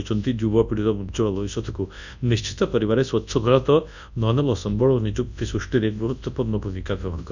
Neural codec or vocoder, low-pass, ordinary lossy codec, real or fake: codec, 24 kHz, 0.9 kbps, WavTokenizer, large speech release; 7.2 kHz; none; fake